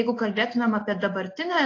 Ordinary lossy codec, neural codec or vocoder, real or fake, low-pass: AAC, 32 kbps; none; real; 7.2 kHz